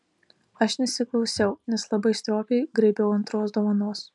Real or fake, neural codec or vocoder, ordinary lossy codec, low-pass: real; none; MP3, 96 kbps; 10.8 kHz